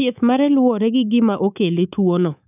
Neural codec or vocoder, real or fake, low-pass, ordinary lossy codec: codec, 24 kHz, 3.1 kbps, DualCodec; fake; 3.6 kHz; none